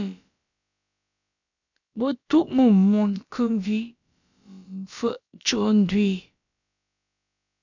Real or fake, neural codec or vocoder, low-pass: fake; codec, 16 kHz, about 1 kbps, DyCAST, with the encoder's durations; 7.2 kHz